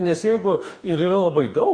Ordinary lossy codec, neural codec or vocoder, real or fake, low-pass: MP3, 48 kbps; codec, 44.1 kHz, 2.6 kbps, DAC; fake; 9.9 kHz